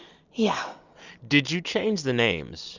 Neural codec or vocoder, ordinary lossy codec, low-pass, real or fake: none; Opus, 64 kbps; 7.2 kHz; real